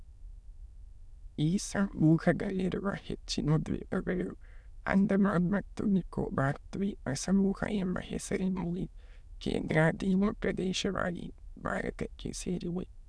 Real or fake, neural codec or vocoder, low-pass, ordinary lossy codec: fake; autoencoder, 22.05 kHz, a latent of 192 numbers a frame, VITS, trained on many speakers; none; none